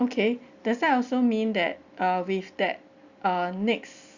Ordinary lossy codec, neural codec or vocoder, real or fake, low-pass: Opus, 64 kbps; none; real; 7.2 kHz